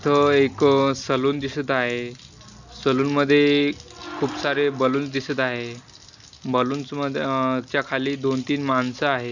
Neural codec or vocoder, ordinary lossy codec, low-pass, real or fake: none; none; 7.2 kHz; real